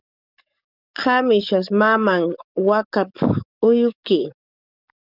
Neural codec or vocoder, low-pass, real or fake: codec, 44.1 kHz, 7.8 kbps, DAC; 5.4 kHz; fake